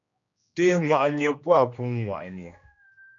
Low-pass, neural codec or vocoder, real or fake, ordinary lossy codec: 7.2 kHz; codec, 16 kHz, 1 kbps, X-Codec, HuBERT features, trained on general audio; fake; MP3, 64 kbps